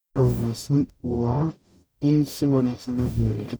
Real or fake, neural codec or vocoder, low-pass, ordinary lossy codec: fake; codec, 44.1 kHz, 0.9 kbps, DAC; none; none